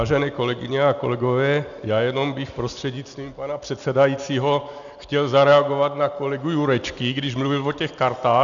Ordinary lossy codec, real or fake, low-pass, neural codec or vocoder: MP3, 96 kbps; real; 7.2 kHz; none